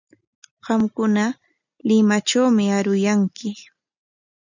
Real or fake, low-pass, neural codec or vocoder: real; 7.2 kHz; none